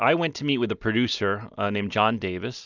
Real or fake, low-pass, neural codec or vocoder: real; 7.2 kHz; none